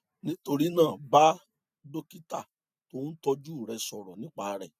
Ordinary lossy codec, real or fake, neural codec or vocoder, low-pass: none; real; none; 14.4 kHz